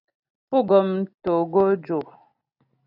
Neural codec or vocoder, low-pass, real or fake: none; 5.4 kHz; real